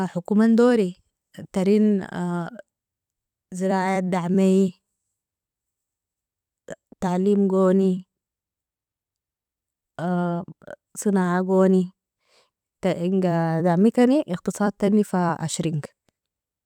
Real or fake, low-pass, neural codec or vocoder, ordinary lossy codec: fake; 19.8 kHz; vocoder, 44.1 kHz, 128 mel bands every 256 samples, BigVGAN v2; none